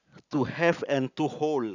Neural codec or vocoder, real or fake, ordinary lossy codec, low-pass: none; real; none; 7.2 kHz